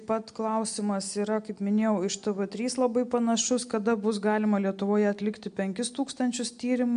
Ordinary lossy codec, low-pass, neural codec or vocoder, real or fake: MP3, 64 kbps; 9.9 kHz; none; real